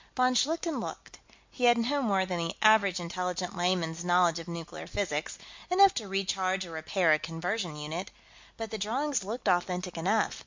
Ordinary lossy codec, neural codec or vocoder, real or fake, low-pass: MP3, 64 kbps; none; real; 7.2 kHz